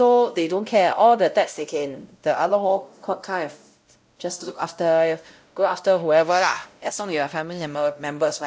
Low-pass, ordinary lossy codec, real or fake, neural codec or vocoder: none; none; fake; codec, 16 kHz, 0.5 kbps, X-Codec, WavLM features, trained on Multilingual LibriSpeech